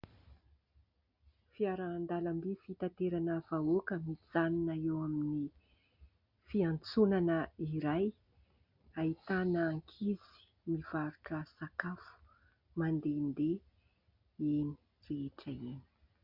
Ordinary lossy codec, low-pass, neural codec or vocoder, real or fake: MP3, 48 kbps; 5.4 kHz; none; real